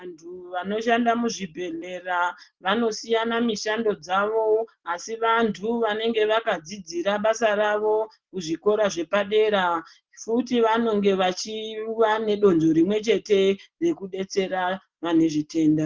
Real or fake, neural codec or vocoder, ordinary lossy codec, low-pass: real; none; Opus, 16 kbps; 7.2 kHz